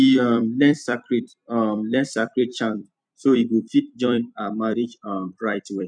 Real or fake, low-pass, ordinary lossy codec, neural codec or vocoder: fake; 9.9 kHz; none; vocoder, 44.1 kHz, 128 mel bands every 256 samples, BigVGAN v2